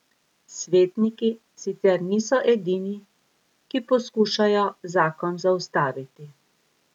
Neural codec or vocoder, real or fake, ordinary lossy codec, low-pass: none; real; none; 19.8 kHz